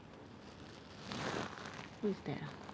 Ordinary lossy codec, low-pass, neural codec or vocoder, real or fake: none; none; none; real